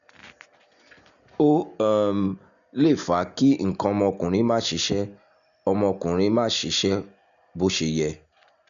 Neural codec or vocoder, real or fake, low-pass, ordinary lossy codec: none; real; 7.2 kHz; none